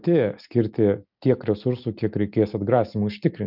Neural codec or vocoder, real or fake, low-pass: none; real; 5.4 kHz